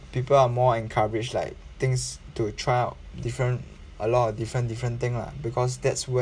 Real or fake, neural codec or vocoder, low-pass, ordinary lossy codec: real; none; 9.9 kHz; none